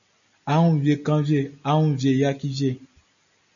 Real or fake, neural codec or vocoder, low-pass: real; none; 7.2 kHz